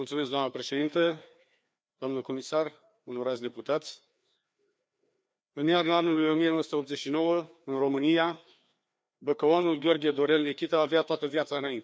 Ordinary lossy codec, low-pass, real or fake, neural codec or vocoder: none; none; fake; codec, 16 kHz, 2 kbps, FreqCodec, larger model